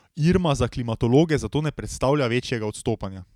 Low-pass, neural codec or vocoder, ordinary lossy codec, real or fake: 19.8 kHz; none; none; real